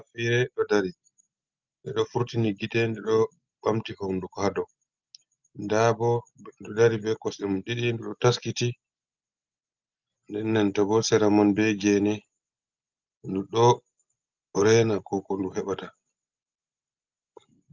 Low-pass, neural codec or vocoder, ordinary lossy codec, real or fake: 7.2 kHz; none; Opus, 24 kbps; real